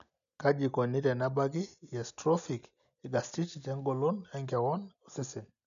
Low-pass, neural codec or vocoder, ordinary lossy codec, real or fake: 7.2 kHz; none; none; real